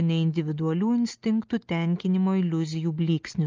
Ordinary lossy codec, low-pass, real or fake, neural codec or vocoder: Opus, 24 kbps; 7.2 kHz; real; none